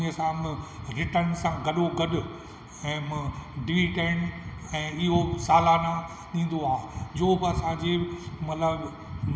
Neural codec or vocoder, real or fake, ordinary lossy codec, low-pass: none; real; none; none